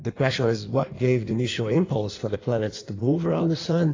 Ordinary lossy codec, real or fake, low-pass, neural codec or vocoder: AAC, 32 kbps; fake; 7.2 kHz; codec, 16 kHz in and 24 kHz out, 1.1 kbps, FireRedTTS-2 codec